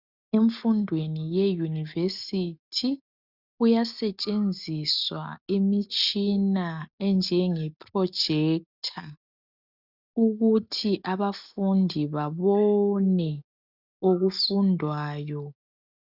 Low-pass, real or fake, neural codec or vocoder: 5.4 kHz; real; none